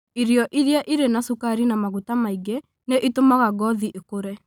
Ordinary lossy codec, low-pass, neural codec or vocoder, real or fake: none; none; none; real